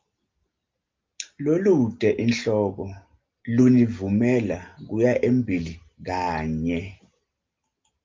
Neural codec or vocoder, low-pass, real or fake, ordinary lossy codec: none; 7.2 kHz; real; Opus, 32 kbps